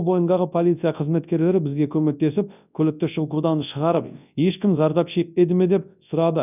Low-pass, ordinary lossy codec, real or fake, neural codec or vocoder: 3.6 kHz; none; fake; codec, 24 kHz, 0.9 kbps, WavTokenizer, large speech release